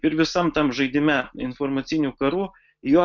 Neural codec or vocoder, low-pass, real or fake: none; 7.2 kHz; real